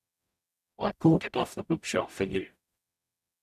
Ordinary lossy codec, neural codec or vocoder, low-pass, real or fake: none; codec, 44.1 kHz, 0.9 kbps, DAC; 14.4 kHz; fake